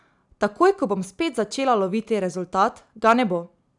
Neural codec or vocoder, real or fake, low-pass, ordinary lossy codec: none; real; 10.8 kHz; none